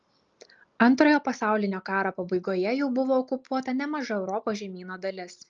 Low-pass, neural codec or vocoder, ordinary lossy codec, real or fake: 7.2 kHz; none; Opus, 24 kbps; real